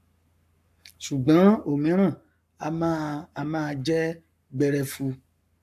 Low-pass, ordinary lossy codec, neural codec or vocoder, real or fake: 14.4 kHz; AAC, 96 kbps; codec, 44.1 kHz, 7.8 kbps, Pupu-Codec; fake